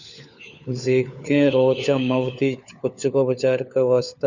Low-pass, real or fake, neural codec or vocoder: 7.2 kHz; fake; codec, 16 kHz, 4 kbps, FunCodec, trained on LibriTTS, 50 frames a second